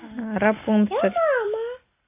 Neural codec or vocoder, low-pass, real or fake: none; 3.6 kHz; real